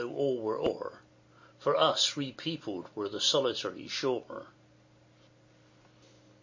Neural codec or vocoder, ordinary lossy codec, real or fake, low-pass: none; MP3, 32 kbps; real; 7.2 kHz